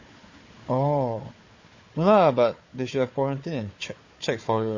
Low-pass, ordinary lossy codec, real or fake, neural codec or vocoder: 7.2 kHz; MP3, 32 kbps; fake; codec, 16 kHz, 4 kbps, FunCodec, trained on Chinese and English, 50 frames a second